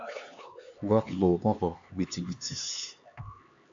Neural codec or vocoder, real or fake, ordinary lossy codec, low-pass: codec, 16 kHz, 4 kbps, X-Codec, HuBERT features, trained on LibriSpeech; fake; AAC, 64 kbps; 7.2 kHz